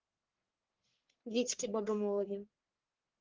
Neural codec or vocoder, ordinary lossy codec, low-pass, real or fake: codec, 44.1 kHz, 1.7 kbps, Pupu-Codec; Opus, 16 kbps; 7.2 kHz; fake